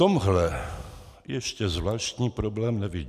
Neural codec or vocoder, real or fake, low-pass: none; real; 14.4 kHz